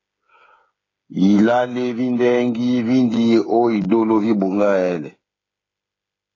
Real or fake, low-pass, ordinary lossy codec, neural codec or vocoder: fake; 7.2 kHz; AAC, 32 kbps; codec, 16 kHz, 8 kbps, FreqCodec, smaller model